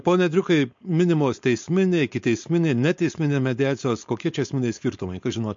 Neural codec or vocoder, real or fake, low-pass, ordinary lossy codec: codec, 16 kHz, 4.8 kbps, FACodec; fake; 7.2 kHz; MP3, 48 kbps